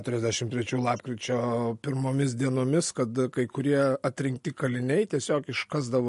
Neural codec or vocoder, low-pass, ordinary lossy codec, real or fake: none; 14.4 kHz; MP3, 48 kbps; real